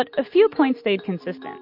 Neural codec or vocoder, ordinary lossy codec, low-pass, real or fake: none; MP3, 32 kbps; 5.4 kHz; real